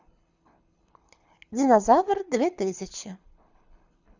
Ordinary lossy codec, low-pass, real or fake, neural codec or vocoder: Opus, 64 kbps; 7.2 kHz; fake; codec, 24 kHz, 6 kbps, HILCodec